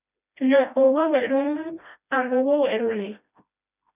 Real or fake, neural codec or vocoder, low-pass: fake; codec, 16 kHz, 1 kbps, FreqCodec, smaller model; 3.6 kHz